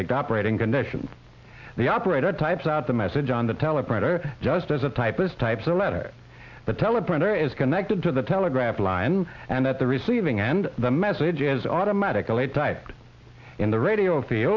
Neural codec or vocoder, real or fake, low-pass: none; real; 7.2 kHz